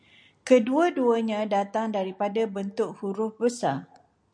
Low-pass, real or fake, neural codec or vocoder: 9.9 kHz; real; none